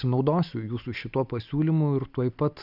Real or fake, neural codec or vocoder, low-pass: real; none; 5.4 kHz